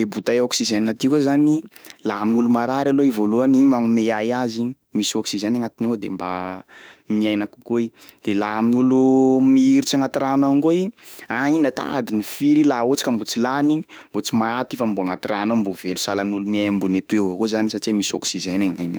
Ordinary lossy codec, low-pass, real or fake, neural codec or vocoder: none; none; fake; autoencoder, 48 kHz, 32 numbers a frame, DAC-VAE, trained on Japanese speech